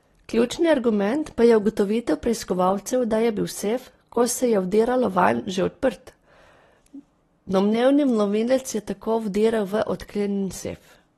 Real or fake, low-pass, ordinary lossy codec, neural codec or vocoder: real; 19.8 kHz; AAC, 32 kbps; none